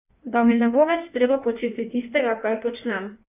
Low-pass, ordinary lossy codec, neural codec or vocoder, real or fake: 3.6 kHz; none; codec, 16 kHz in and 24 kHz out, 1.1 kbps, FireRedTTS-2 codec; fake